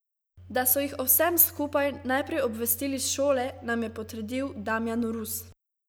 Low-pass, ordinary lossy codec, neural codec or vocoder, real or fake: none; none; none; real